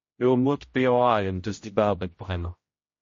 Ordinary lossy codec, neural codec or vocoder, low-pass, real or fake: MP3, 32 kbps; codec, 16 kHz, 0.5 kbps, X-Codec, HuBERT features, trained on general audio; 7.2 kHz; fake